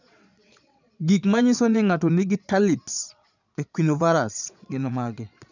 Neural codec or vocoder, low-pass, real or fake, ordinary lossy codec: vocoder, 22.05 kHz, 80 mel bands, Vocos; 7.2 kHz; fake; none